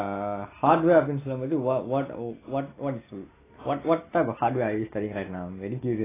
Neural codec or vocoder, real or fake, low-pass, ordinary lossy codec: none; real; 3.6 kHz; AAC, 16 kbps